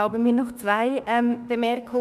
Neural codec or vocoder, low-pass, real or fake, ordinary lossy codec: autoencoder, 48 kHz, 32 numbers a frame, DAC-VAE, trained on Japanese speech; 14.4 kHz; fake; none